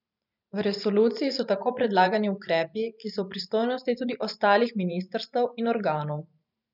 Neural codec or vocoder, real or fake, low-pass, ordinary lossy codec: vocoder, 44.1 kHz, 128 mel bands every 512 samples, BigVGAN v2; fake; 5.4 kHz; none